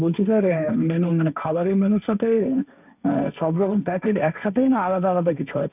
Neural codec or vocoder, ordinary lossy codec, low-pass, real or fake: codec, 16 kHz, 1.1 kbps, Voila-Tokenizer; none; 3.6 kHz; fake